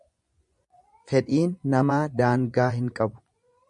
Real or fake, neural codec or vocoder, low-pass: fake; vocoder, 24 kHz, 100 mel bands, Vocos; 10.8 kHz